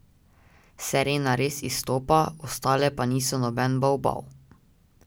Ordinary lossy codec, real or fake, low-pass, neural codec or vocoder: none; real; none; none